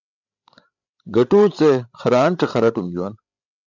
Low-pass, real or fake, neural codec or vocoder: 7.2 kHz; fake; codec, 16 kHz, 8 kbps, FreqCodec, larger model